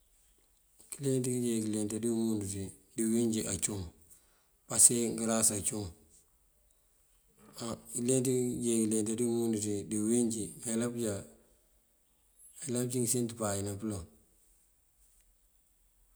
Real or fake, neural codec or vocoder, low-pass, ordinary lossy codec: real; none; none; none